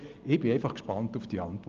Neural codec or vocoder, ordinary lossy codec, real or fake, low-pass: none; Opus, 32 kbps; real; 7.2 kHz